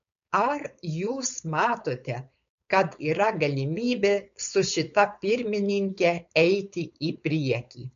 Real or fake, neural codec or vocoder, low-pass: fake; codec, 16 kHz, 4.8 kbps, FACodec; 7.2 kHz